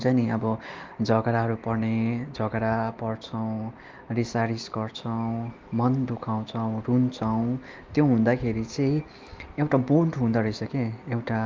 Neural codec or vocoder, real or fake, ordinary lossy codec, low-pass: none; real; Opus, 32 kbps; 7.2 kHz